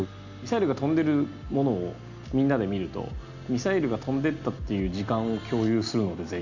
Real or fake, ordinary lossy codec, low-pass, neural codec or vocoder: real; none; 7.2 kHz; none